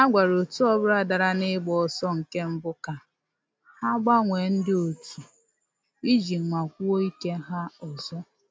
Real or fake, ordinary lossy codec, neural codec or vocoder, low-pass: real; none; none; none